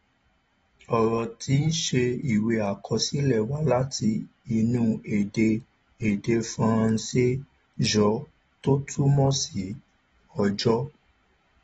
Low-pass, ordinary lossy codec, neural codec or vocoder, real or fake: 14.4 kHz; AAC, 24 kbps; none; real